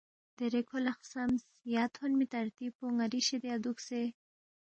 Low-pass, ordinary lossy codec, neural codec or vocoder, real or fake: 9.9 kHz; MP3, 32 kbps; none; real